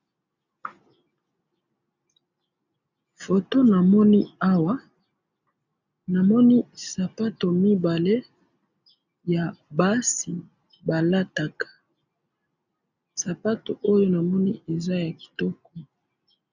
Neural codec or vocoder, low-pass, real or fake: none; 7.2 kHz; real